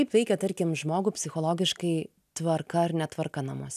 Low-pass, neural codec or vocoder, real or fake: 14.4 kHz; none; real